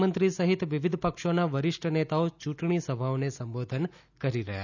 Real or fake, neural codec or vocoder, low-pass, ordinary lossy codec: real; none; 7.2 kHz; none